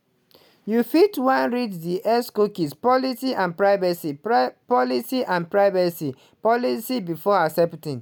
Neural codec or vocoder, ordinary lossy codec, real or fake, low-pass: none; none; real; none